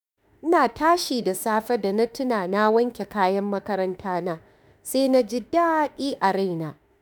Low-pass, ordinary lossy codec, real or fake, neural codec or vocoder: none; none; fake; autoencoder, 48 kHz, 32 numbers a frame, DAC-VAE, trained on Japanese speech